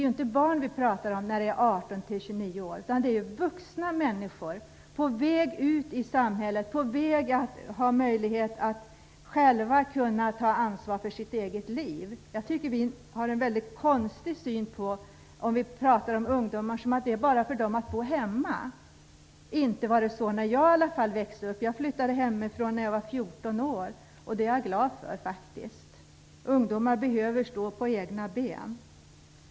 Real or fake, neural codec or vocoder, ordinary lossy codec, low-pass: real; none; none; none